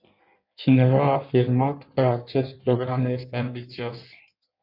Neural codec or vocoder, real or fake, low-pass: codec, 16 kHz in and 24 kHz out, 1.1 kbps, FireRedTTS-2 codec; fake; 5.4 kHz